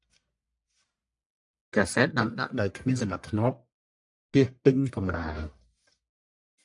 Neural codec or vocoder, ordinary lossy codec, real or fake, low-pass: codec, 44.1 kHz, 1.7 kbps, Pupu-Codec; AAC, 64 kbps; fake; 10.8 kHz